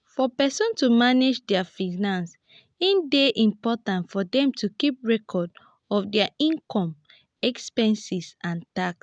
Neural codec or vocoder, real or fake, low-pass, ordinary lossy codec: none; real; 9.9 kHz; none